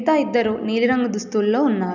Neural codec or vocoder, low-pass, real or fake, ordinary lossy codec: none; 7.2 kHz; real; none